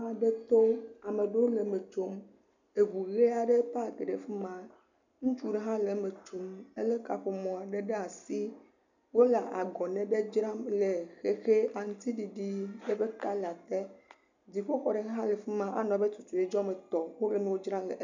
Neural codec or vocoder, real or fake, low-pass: none; real; 7.2 kHz